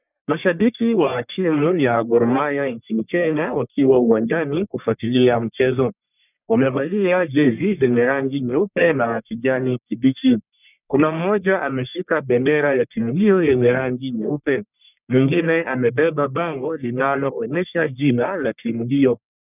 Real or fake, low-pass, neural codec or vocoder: fake; 3.6 kHz; codec, 44.1 kHz, 1.7 kbps, Pupu-Codec